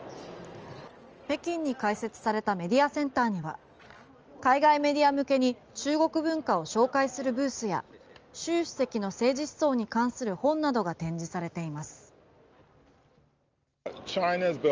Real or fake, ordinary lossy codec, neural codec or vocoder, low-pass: real; Opus, 24 kbps; none; 7.2 kHz